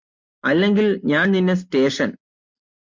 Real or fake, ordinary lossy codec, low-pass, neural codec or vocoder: real; MP3, 48 kbps; 7.2 kHz; none